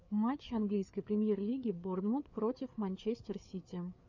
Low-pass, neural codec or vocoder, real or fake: 7.2 kHz; codec, 16 kHz, 4 kbps, FreqCodec, larger model; fake